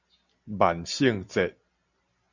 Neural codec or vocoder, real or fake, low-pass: none; real; 7.2 kHz